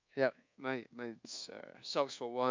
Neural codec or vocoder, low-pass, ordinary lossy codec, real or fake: codec, 24 kHz, 1.2 kbps, DualCodec; 7.2 kHz; MP3, 64 kbps; fake